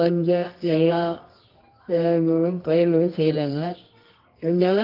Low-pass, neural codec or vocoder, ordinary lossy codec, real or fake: 5.4 kHz; codec, 24 kHz, 0.9 kbps, WavTokenizer, medium music audio release; Opus, 32 kbps; fake